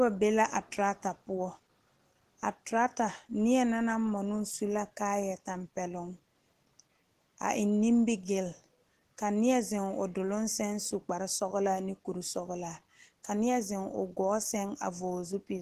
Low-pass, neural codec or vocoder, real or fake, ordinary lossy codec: 14.4 kHz; none; real; Opus, 16 kbps